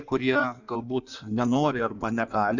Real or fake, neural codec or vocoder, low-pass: fake; codec, 16 kHz in and 24 kHz out, 1.1 kbps, FireRedTTS-2 codec; 7.2 kHz